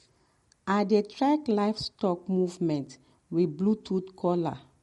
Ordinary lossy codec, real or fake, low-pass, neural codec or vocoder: MP3, 48 kbps; real; 19.8 kHz; none